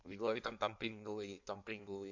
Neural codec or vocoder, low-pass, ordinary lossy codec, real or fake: codec, 16 kHz in and 24 kHz out, 1.1 kbps, FireRedTTS-2 codec; 7.2 kHz; Opus, 64 kbps; fake